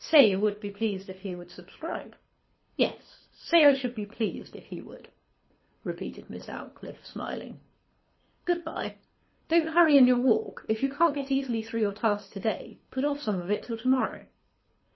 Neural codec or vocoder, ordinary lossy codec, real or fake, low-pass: codec, 24 kHz, 6 kbps, HILCodec; MP3, 24 kbps; fake; 7.2 kHz